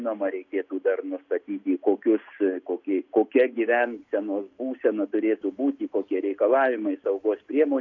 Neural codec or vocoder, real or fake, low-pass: none; real; 7.2 kHz